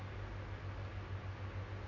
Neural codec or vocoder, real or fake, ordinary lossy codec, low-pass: none; real; none; 7.2 kHz